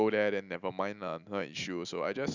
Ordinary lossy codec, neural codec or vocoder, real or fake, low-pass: none; none; real; 7.2 kHz